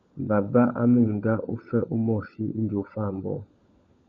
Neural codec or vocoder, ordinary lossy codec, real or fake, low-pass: codec, 16 kHz, 16 kbps, FunCodec, trained on LibriTTS, 50 frames a second; MP3, 48 kbps; fake; 7.2 kHz